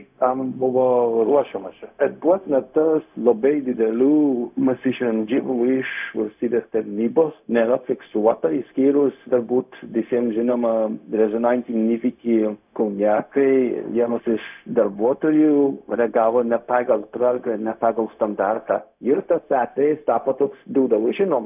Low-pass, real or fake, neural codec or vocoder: 3.6 kHz; fake; codec, 16 kHz, 0.4 kbps, LongCat-Audio-Codec